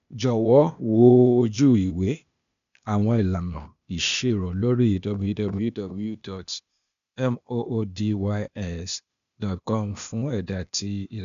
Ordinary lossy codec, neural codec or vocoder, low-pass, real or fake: none; codec, 16 kHz, 0.8 kbps, ZipCodec; 7.2 kHz; fake